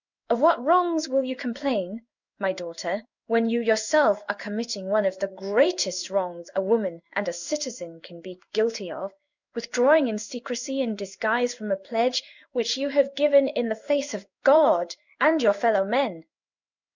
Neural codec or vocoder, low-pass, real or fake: codec, 16 kHz in and 24 kHz out, 1 kbps, XY-Tokenizer; 7.2 kHz; fake